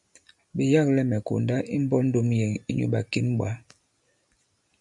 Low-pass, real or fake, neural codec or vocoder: 10.8 kHz; real; none